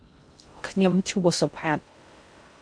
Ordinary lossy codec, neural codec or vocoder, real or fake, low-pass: MP3, 64 kbps; codec, 16 kHz in and 24 kHz out, 0.6 kbps, FocalCodec, streaming, 2048 codes; fake; 9.9 kHz